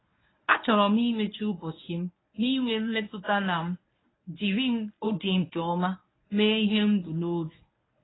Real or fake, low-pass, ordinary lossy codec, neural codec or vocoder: fake; 7.2 kHz; AAC, 16 kbps; codec, 24 kHz, 0.9 kbps, WavTokenizer, medium speech release version 1